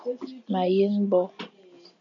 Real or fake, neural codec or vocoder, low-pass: real; none; 7.2 kHz